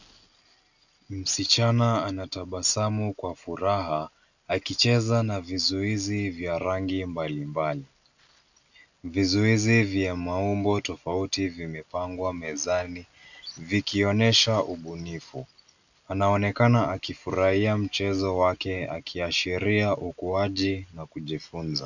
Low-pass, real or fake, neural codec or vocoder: 7.2 kHz; real; none